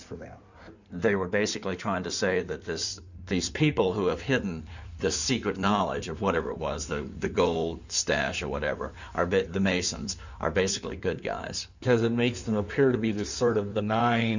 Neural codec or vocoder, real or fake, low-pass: codec, 16 kHz in and 24 kHz out, 2.2 kbps, FireRedTTS-2 codec; fake; 7.2 kHz